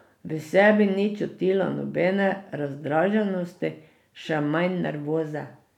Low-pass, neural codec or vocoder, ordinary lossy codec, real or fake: 19.8 kHz; none; none; real